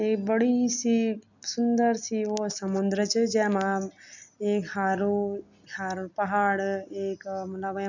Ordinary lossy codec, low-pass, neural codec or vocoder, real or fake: none; 7.2 kHz; none; real